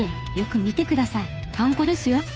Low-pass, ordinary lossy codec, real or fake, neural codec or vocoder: none; none; fake; codec, 16 kHz, 2 kbps, FunCodec, trained on Chinese and English, 25 frames a second